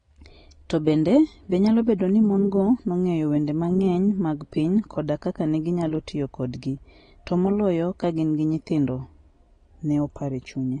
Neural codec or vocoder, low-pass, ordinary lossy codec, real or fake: none; 9.9 kHz; AAC, 32 kbps; real